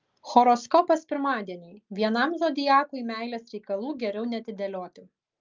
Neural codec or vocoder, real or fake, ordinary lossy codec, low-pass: none; real; Opus, 24 kbps; 7.2 kHz